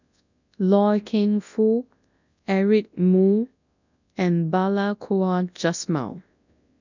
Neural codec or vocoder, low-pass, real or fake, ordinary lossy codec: codec, 24 kHz, 0.9 kbps, WavTokenizer, large speech release; 7.2 kHz; fake; none